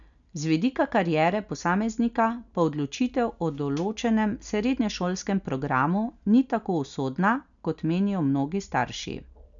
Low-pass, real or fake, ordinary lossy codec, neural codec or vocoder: 7.2 kHz; real; none; none